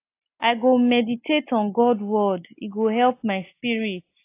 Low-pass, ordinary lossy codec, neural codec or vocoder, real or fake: 3.6 kHz; AAC, 24 kbps; none; real